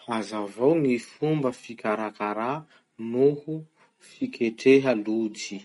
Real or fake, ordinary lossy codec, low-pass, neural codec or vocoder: real; MP3, 48 kbps; 19.8 kHz; none